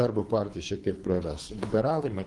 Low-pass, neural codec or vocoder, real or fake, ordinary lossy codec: 10.8 kHz; codec, 24 kHz, 3 kbps, HILCodec; fake; Opus, 24 kbps